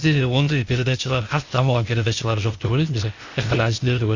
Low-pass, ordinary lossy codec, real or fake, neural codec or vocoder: 7.2 kHz; Opus, 64 kbps; fake; codec, 16 kHz, 0.8 kbps, ZipCodec